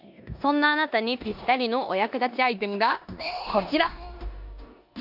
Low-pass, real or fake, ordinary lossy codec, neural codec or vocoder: 5.4 kHz; fake; none; codec, 16 kHz in and 24 kHz out, 0.9 kbps, LongCat-Audio-Codec, four codebook decoder